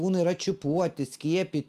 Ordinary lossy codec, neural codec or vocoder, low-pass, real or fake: Opus, 32 kbps; none; 14.4 kHz; real